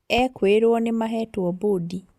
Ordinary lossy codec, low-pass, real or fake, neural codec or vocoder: Opus, 64 kbps; 14.4 kHz; real; none